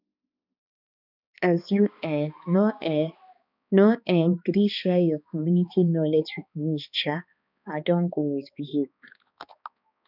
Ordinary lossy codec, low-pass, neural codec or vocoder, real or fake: none; 5.4 kHz; codec, 16 kHz, 2 kbps, X-Codec, HuBERT features, trained on balanced general audio; fake